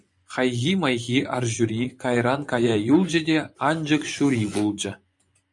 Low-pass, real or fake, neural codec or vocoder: 10.8 kHz; fake; vocoder, 24 kHz, 100 mel bands, Vocos